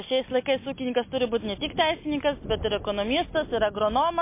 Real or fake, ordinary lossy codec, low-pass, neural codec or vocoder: real; MP3, 24 kbps; 3.6 kHz; none